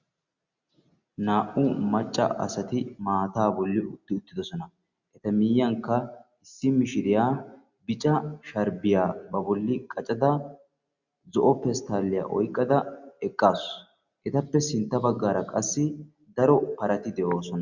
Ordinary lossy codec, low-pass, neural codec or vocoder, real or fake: Opus, 64 kbps; 7.2 kHz; none; real